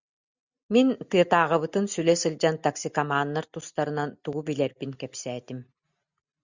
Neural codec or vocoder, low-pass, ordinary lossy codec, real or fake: none; 7.2 kHz; Opus, 64 kbps; real